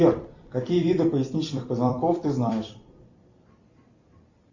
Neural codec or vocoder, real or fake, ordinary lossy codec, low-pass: vocoder, 44.1 kHz, 128 mel bands every 256 samples, BigVGAN v2; fake; Opus, 64 kbps; 7.2 kHz